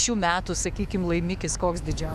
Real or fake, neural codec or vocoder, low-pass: fake; autoencoder, 48 kHz, 128 numbers a frame, DAC-VAE, trained on Japanese speech; 14.4 kHz